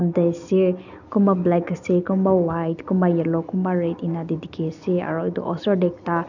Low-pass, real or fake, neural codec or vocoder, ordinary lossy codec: 7.2 kHz; real; none; none